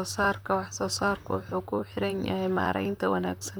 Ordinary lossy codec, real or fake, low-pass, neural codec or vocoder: none; fake; none; vocoder, 44.1 kHz, 128 mel bands, Pupu-Vocoder